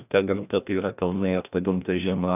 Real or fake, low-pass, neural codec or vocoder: fake; 3.6 kHz; codec, 16 kHz, 1 kbps, FreqCodec, larger model